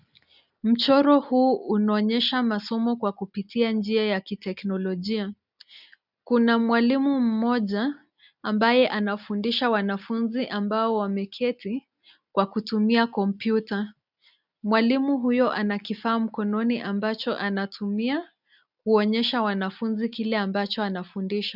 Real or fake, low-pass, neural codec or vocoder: real; 5.4 kHz; none